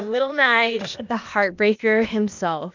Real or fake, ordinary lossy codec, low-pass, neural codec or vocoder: fake; MP3, 64 kbps; 7.2 kHz; codec, 16 kHz, 0.8 kbps, ZipCodec